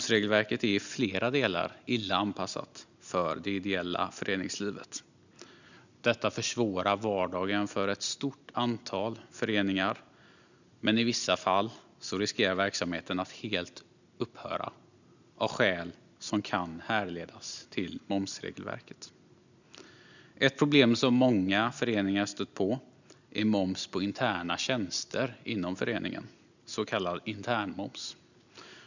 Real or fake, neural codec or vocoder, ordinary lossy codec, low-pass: real; none; none; 7.2 kHz